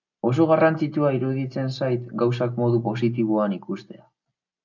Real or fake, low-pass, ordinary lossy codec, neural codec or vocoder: real; 7.2 kHz; MP3, 64 kbps; none